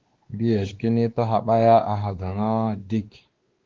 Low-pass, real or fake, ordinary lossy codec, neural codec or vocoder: 7.2 kHz; fake; Opus, 16 kbps; codec, 16 kHz, 2 kbps, X-Codec, WavLM features, trained on Multilingual LibriSpeech